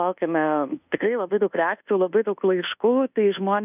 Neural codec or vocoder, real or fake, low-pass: codec, 24 kHz, 1.2 kbps, DualCodec; fake; 3.6 kHz